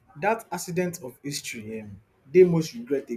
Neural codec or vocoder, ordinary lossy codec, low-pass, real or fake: none; none; 14.4 kHz; real